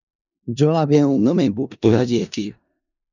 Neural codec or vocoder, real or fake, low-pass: codec, 16 kHz in and 24 kHz out, 0.4 kbps, LongCat-Audio-Codec, four codebook decoder; fake; 7.2 kHz